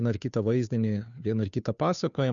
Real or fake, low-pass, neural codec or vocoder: fake; 7.2 kHz; codec, 16 kHz, 2 kbps, FunCodec, trained on Chinese and English, 25 frames a second